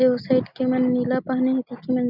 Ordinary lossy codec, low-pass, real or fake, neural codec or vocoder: none; 5.4 kHz; real; none